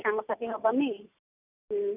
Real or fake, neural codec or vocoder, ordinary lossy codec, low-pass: real; none; none; 3.6 kHz